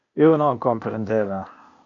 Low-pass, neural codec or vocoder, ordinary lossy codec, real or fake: 7.2 kHz; codec, 16 kHz, 0.8 kbps, ZipCodec; MP3, 48 kbps; fake